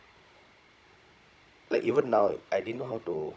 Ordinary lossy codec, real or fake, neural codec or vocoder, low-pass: none; fake; codec, 16 kHz, 16 kbps, FunCodec, trained on Chinese and English, 50 frames a second; none